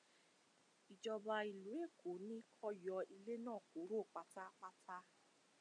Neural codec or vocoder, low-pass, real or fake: none; 9.9 kHz; real